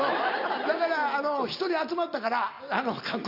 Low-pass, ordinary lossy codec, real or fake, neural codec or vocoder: 5.4 kHz; none; real; none